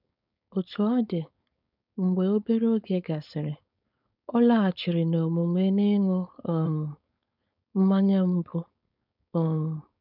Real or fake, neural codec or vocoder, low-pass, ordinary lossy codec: fake; codec, 16 kHz, 4.8 kbps, FACodec; 5.4 kHz; none